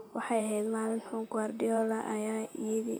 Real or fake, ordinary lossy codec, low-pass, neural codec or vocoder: fake; none; none; vocoder, 44.1 kHz, 128 mel bands, Pupu-Vocoder